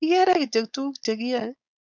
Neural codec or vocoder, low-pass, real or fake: codec, 16 kHz, 4.8 kbps, FACodec; 7.2 kHz; fake